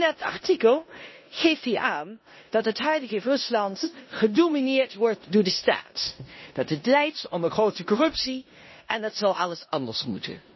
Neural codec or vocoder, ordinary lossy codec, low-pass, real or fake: codec, 16 kHz in and 24 kHz out, 0.9 kbps, LongCat-Audio-Codec, four codebook decoder; MP3, 24 kbps; 7.2 kHz; fake